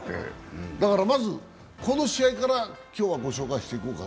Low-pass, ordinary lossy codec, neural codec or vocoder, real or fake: none; none; none; real